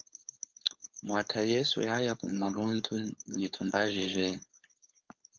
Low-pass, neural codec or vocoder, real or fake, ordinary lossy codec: 7.2 kHz; codec, 16 kHz, 4.8 kbps, FACodec; fake; Opus, 32 kbps